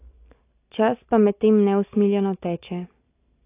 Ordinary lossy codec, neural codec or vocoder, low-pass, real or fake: AAC, 24 kbps; none; 3.6 kHz; real